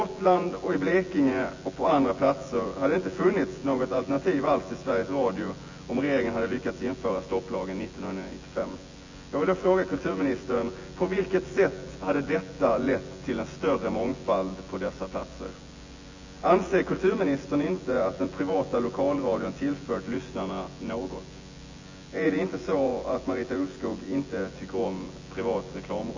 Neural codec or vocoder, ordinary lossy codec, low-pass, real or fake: vocoder, 24 kHz, 100 mel bands, Vocos; AAC, 48 kbps; 7.2 kHz; fake